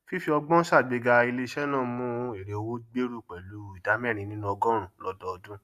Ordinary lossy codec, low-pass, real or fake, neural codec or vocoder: AAC, 96 kbps; 14.4 kHz; real; none